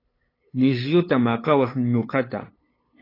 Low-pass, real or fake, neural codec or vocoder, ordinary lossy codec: 5.4 kHz; fake; codec, 16 kHz, 8 kbps, FunCodec, trained on LibriTTS, 25 frames a second; AAC, 24 kbps